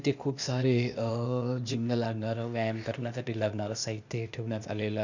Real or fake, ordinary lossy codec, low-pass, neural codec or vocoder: fake; none; 7.2 kHz; codec, 16 kHz, 0.8 kbps, ZipCodec